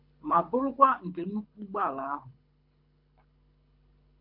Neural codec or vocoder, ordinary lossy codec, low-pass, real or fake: codec, 24 kHz, 6 kbps, HILCodec; MP3, 48 kbps; 5.4 kHz; fake